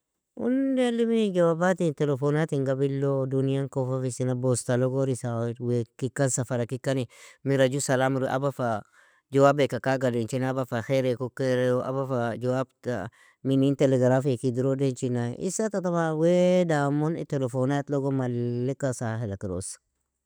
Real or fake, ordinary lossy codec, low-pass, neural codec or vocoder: real; none; none; none